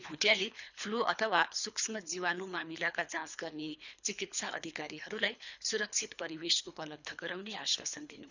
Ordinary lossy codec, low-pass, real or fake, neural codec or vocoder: none; 7.2 kHz; fake; codec, 24 kHz, 3 kbps, HILCodec